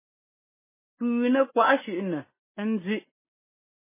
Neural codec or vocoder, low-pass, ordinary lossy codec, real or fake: none; 3.6 kHz; MP3, 16 kbps; real